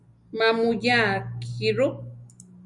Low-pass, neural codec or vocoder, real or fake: 10.8 kHz; none; real